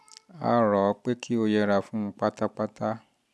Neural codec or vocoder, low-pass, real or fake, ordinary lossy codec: none; none; real; none